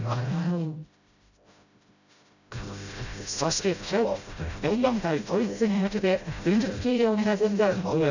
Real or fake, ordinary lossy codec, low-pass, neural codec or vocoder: fake; none; 7.2 kHz; codec, 16 kHz, 0.5 kbps, FreqCodec, smaller model